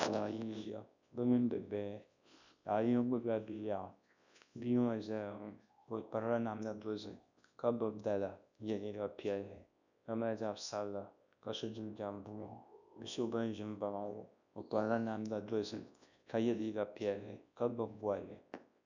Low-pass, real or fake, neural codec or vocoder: 7.2 kHz; fake; codec, 24 kHz, 0.9 kbps, WavTokenizer, large speech release